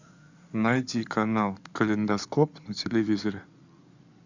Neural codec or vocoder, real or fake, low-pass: codec, 16 kHz in and 24 kHz out, 2.2 kbps, FireRedTTS-2 codec; fake; 7.2 kHz